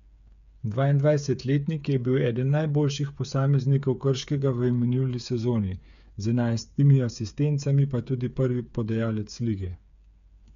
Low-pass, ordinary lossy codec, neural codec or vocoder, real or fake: 7.2 kHz; none; codec, 16 kHz, 8 kbps, FreqCodec, smaller model; fake